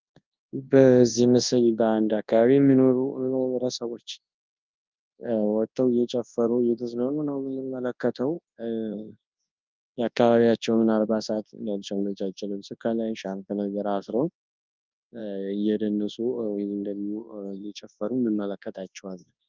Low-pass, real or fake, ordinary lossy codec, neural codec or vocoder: 7.2 kHz; fake; Opus, 24 kbps; codec, 24 kHz, 0.9 kbps, WavTokenizer, large speech release